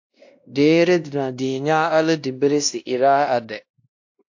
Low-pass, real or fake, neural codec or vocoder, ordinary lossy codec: 7.2 kHz; fake; codec, 16 kHz, 1 kbps, X-Codec, WavLM features, trained on Multilingual LibriSpeech; AAC, 48 kbps